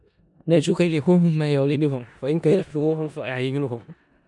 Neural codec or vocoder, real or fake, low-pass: codec, 16 kHz in and 24 kHz out, 0.4 kbps, LongCat-Audio-Codec, four codebook decoder; fake; 10.8 kHz